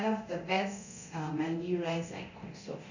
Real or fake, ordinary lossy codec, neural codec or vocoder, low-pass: fake; none; codec, 24 kHz, 0.9 kbps, DualCodec; 7.2 kHz